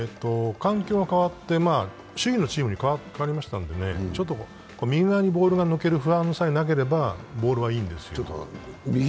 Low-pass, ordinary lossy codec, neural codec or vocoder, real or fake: none; none; none; real